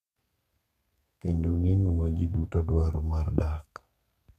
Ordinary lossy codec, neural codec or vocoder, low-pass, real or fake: MP3, 96 kbps; codec, 32 kHz, 1.9 kbps, SNAC; 14.4 kHz; fake